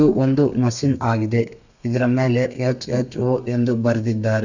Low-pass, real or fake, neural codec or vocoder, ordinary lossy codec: 7.2 kHz; fake; codec, 32 kHz, 1.9 kbps, SNAC; none